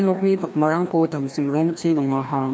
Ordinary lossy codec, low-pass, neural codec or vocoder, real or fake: none; none; codec, 16 kHz, 1 kbps, FreqCodec, larger model; fake